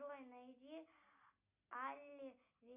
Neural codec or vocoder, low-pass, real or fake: none; 3.6 kHz; real